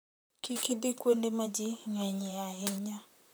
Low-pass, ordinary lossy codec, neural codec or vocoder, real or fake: none; none; vocoder, 44.1 kHz, 128 mel bands, Pupu-Vocoder; fake